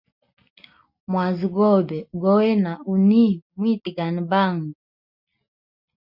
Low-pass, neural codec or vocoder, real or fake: 5.4 kHz; none; real